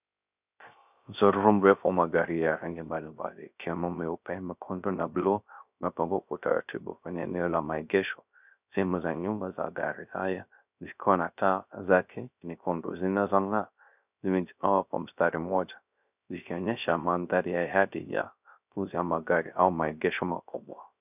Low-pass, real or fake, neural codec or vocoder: 3.6 kHz; fake; codec, 16 kHz, 0.3 kbps, FocalCodec